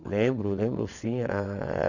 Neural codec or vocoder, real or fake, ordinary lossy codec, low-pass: vocoder, 22.05 kHz, 80 mel bands, WaveNeXt; fake; none; 7.2 kHz